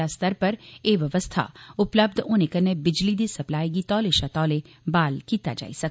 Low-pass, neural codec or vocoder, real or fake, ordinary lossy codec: none; none; real; none